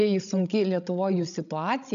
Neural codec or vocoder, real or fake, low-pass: codec, 16 kHz, 16 kbps, FreqCodec, larger model; fake; 7.2 kHz